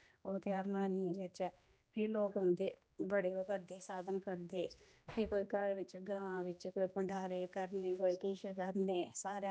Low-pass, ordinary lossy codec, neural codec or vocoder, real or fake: none; none; codec, 16 kHz, 1 kbps, X-Codec, HuBERT features, trained on general audio; fake